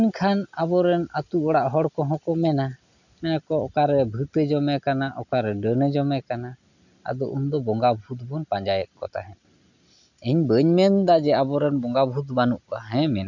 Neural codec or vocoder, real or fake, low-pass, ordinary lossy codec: none; real; 7.2 kHz; none